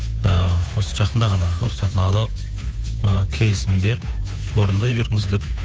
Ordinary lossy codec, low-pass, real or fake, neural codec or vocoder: none; none; fake; codec, 16 kHz, 2 kbps, FunCodec, trained on Chinese and English, 25 frames a second